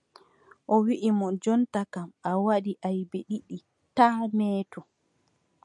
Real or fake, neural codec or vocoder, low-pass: real; none; 10.8 kHz